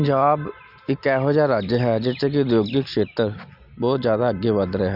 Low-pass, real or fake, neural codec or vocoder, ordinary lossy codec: 5.4 kHz; real; none; none